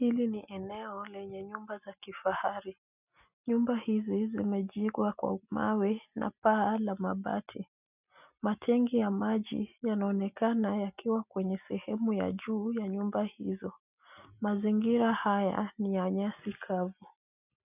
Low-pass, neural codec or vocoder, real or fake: 3.6 kHz; none; real